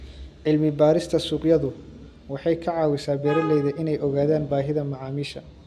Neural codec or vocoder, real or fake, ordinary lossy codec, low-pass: none; real; none; 14.4 kHz